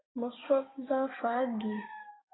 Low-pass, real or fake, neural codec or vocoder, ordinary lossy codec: 7.2 kHz; fake; codec, 16 kHz, 6 kbps, DAC; AAC, 16 kbps